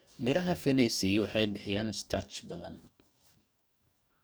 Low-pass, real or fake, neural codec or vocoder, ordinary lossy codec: none; fake; codec, 44.1 kHz, 2.6 kbps, DAC; none